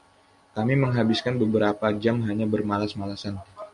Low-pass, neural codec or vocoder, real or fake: 10.8 kHz; none; real